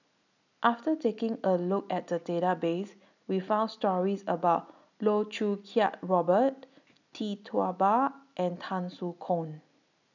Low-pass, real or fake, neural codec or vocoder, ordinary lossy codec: 7.2 kHz; real; none; none